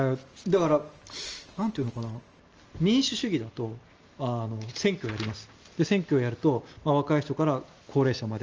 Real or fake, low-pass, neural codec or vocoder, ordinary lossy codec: real; 7.2 kHz; none; Opus, 24 kbps